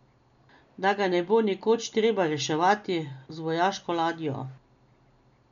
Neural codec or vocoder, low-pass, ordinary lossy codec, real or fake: none; 7.2 kHz; none; real